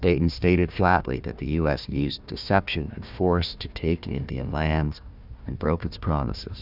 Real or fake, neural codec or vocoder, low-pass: fake; codec, 16 kHz, 1 kbps, FunCodec, trained on Chinese and English, 50 frames a second; 5.4 kHz